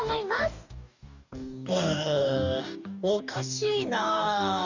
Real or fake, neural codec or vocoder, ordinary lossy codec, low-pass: fake; codec, 44.1 kHz, 2.6 kbps, DAC; none; 7.2 kHz